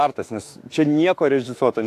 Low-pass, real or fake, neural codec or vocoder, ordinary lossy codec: 14.4 kHz; fake; autoencoder, 48 kHz, 32 numbers a frame, DAC-VAE, trained on Japanese speech; AAC, 64 kbps